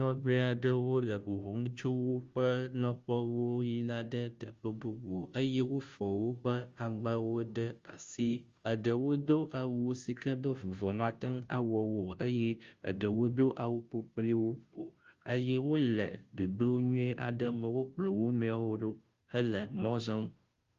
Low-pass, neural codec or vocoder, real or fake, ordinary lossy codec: 7.2 kHz; codec, 16 kHz, 0.5 kbps, FunCodec, trained on Chinese and English, 25 frames a second; fake; Opus, 32 kbps